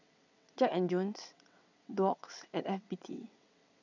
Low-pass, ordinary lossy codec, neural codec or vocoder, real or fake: 7.2 kHz; none; vocoder, 22.05 kHz, 80 mel bands, WaveNeXt; fake